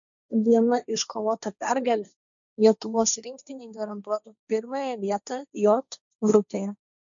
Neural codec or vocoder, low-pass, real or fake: codec, 16 kHz, 1.1 kbps, Voila-Tokenizer; 7.2 kHz; fake